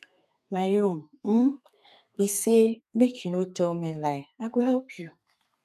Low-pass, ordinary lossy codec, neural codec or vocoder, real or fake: 14.4 kHz; none; codec, 32 kHz, 1.9 kbps, SNAC; fake